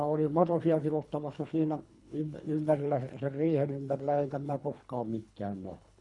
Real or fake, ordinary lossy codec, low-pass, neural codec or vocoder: fake; none; 10.8 kHz; codec, 24 kHz, 3 kbps, HILCodec